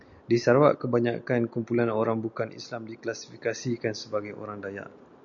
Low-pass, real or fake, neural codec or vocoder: 7.2 kHz; real; none